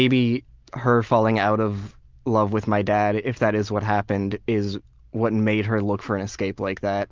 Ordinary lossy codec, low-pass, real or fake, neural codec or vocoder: Opus, 32 kbps; 7.2 kHz; real; none